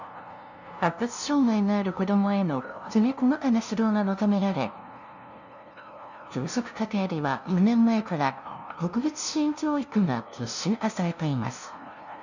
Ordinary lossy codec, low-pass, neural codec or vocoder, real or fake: none; 7.2 kHz; codec, 16 kHz, 0.5 kbps, FunCodec, trained on LibriTTS, 25 frames a second; fake